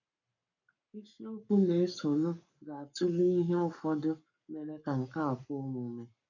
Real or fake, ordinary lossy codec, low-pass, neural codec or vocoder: fake; none; 7.2 kHz; codec, 44.1 kHz, 7.8 kbps, Pupu-Codec